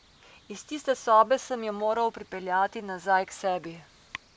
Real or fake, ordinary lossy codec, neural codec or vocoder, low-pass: real; none; none; none